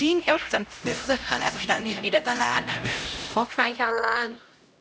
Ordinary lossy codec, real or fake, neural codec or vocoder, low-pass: none; fake; codec, 16 kHz, 0.5 kbps, X-Codec, HuBERT features, trained on LibriSpeech; none